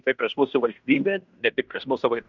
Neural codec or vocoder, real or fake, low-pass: codec, 16 kHz in and 24 kHz out, 0.9 kbps, LongCat-Audio-Codec, fine tuned four codebook decoder; fake; 7.2 kHz